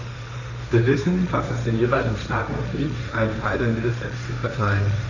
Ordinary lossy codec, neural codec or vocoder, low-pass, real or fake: none; codec, 16 kHz, 1.1 kbps, Voila-Tokenizer; 7.2 kHz; fake